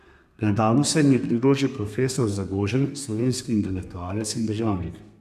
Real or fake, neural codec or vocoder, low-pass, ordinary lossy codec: fake; codec, 32 kHz, 1.9 kbps, SNAC; 14.4 kHz; none